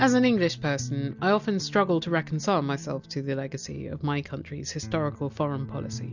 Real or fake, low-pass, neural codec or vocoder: real; 7.2 kHz; none